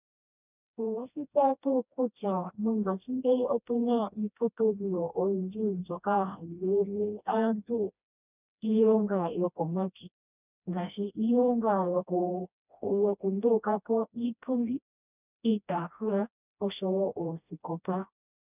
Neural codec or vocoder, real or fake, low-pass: codec, 16 kHz, 1 kbps, FreqCodec, smaller model; fake; 3.6 kHz